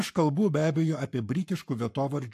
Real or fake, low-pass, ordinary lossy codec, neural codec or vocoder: fake; 14.4 kHz; AAC, 64 kbps; codec, 44.1 kHz, 7.8 kbps, Pupu-Codec